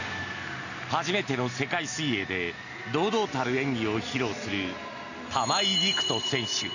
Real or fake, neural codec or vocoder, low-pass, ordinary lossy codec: real; none; 7.2 kHz; none